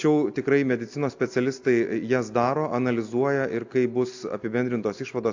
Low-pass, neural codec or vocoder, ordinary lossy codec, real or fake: 7.2 kHz; none; AAC, 48 kbps; real